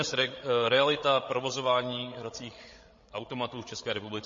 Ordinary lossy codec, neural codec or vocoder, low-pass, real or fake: MP3, 32 kbps; codec, 16 kHz, 16 kbps, FreqCodec, larger model; 7.2 kHz; fake